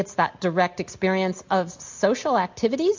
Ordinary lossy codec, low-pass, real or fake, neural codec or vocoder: MP3, 64 kbps; 7.2 kHz; real; none